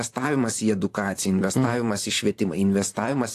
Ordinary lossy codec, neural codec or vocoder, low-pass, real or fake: AAC, 64 kbps; vocoder, 44.1 kHz, 128 mel bands, Pupu-Vocoder; 14.4 kHz; fake